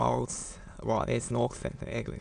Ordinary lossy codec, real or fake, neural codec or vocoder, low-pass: none; fake; autoencoder, 22.05 kHz, a latent of 192 numbers a frame, VITS, trained on many speakers; 9.9 kHz